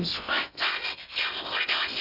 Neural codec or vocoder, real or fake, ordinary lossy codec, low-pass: codec, 16 kHz in and 24 kHz out, 0.6 kbps, FocalCodec, streaming, 2048 codes; fake; none; 5.4 kHz